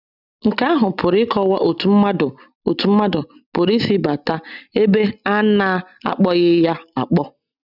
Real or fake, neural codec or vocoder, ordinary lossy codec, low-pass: real; none; none; 5.4 kHz